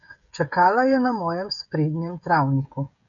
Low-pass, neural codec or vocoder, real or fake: 7.2 kHz; codec, 16 kHz, 16 kbps, FreqCodec, smaller model; fake